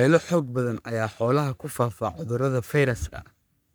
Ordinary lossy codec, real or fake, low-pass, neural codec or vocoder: none; fake; none; codec, 44.1 kHz, 3.4 kbps, Pupu-Codec